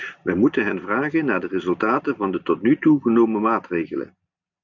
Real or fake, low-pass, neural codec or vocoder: real; 7.2 kHz; none